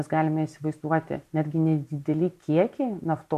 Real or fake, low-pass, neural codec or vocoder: real; 14.4 kHz; none